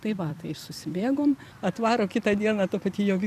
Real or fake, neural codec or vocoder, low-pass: fake; vocoder, 44.1 kHz, 128 mel bands every 256 samples, BigVGAN v2; 14.4 kHz